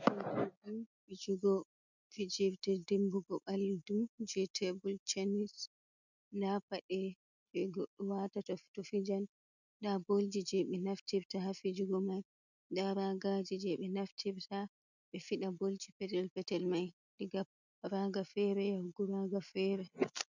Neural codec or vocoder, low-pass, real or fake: none; 7.2 kHz; real